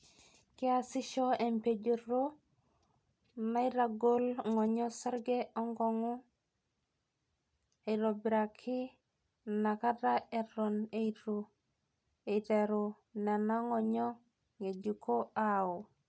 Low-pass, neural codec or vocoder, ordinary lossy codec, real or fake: none; none; none; real